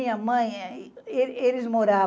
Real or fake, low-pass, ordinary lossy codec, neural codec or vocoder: real; none; none; none